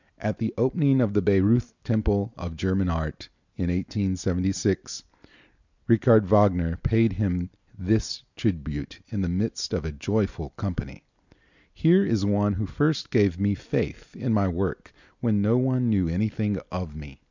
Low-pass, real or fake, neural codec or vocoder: 7.2 kHz; real; none